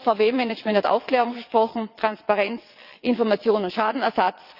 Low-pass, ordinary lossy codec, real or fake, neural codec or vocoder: 5.4 kHz; Opus, 64 kbps; fake; vocoder, 22.05 kHz, 80 mel bands, WaveNeXt